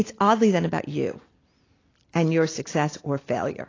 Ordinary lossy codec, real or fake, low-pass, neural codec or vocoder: AAC, 32 kbps; real; 7.2 kHz; none